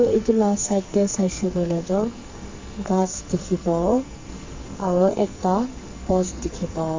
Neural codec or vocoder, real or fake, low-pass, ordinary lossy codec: codec, 44.1 kHz, 2.6 kbps, SNAC; fake; 7.2 kHz; MP3, 64 kbps